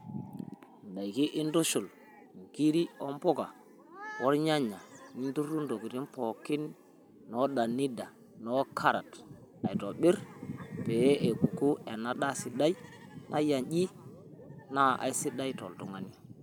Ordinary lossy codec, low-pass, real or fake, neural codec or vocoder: none; none; real; none